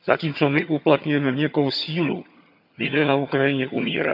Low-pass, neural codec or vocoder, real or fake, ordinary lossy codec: 5.4 kHz; vocoder, 22.05 kHz, 80 mel bands, HiFi-GAN; fake; MP3, 48 kbps